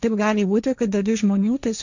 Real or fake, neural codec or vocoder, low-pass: fake; codec, 16 kHz, 1.1 kbps, Voila-Tokenizer; 7.2 kHz